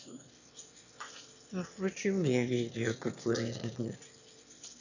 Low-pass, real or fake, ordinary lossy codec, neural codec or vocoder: 7.2 kHz; fake; none; autoencoder, 22.05 kHz, a latent of 192 numbers a frame, VITS, trained on one speaker